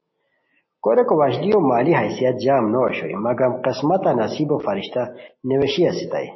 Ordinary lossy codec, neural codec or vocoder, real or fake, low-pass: MP3, 24 kbps; none; real; 7.2 kHz